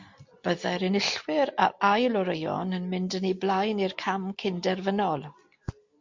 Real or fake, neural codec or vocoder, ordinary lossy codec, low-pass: real; none; MP3, 48 kbps; 7.2 kHz